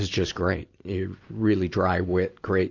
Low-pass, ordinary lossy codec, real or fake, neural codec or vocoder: 7.2 kHz; AAC, 48 kbps; real; none